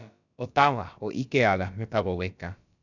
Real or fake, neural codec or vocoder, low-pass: fake; codec, 16 kHz, about 1 kbps, DyCAST, with the encoder's durations; 7.2 kHz